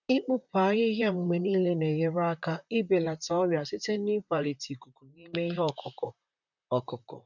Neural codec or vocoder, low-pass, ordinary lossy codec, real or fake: vocoder, 44.1 kHz, 128 mel bands, Pupu-Vocoder; 7.2 kHz; none; fake